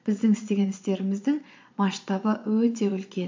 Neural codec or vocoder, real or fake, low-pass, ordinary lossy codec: vocoder, 22.05 kHz, 80 mel bands, Vocos; fake; 7.2 kHz; MP3, 48 kbps